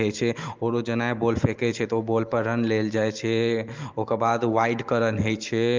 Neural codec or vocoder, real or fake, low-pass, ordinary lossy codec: none; real; 7.2 kHz; Opus, 32 kbps